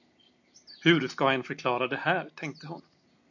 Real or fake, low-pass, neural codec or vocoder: real; 7.2 kHz; none